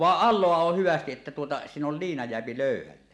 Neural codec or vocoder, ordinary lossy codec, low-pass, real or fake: none; none; none; real